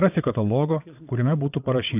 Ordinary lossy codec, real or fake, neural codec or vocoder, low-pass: AAC, 32 kbps; fake; vocoder, 22.05 kHz, 80 mel bands, WaveNeXt; 3.6 kHz